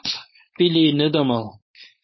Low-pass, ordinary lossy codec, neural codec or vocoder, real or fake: 7.2 kHz; MP3, 24 kbps; codec, 16 kHz, 4.8 kbps, FACodec; fake